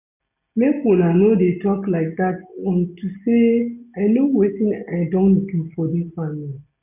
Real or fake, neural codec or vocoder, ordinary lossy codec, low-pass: real; none; none; 3.6 kHz